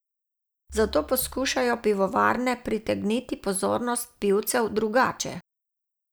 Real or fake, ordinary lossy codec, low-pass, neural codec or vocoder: real; none; none; none